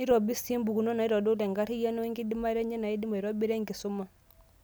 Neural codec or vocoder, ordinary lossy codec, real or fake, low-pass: none; none; real; none